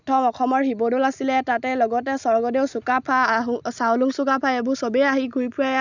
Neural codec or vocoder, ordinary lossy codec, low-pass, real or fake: none; none; 7.2 kHz; real